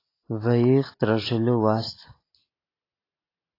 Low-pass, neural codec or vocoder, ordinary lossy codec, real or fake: 5.4 kHz; none; AAC, 24 kbps; real